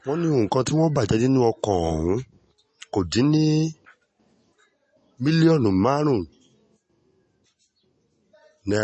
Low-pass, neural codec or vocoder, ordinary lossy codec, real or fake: 9.9 kHz; none; MP3, 32 kbps; real